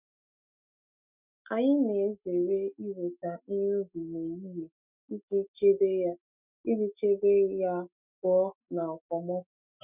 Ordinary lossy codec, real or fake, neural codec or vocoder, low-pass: none; fake; autoencoder, 48 kHz, 128 numbers a frame, DAC-VAE, trained on Japanese speech; 3.6 kHz